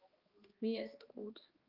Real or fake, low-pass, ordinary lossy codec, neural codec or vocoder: fake; 5.4 kHz; Opus, 32 kbps; codec, 16 kHz, 1 kbps, X-Codec, HuBERT features, trained on balanced general audio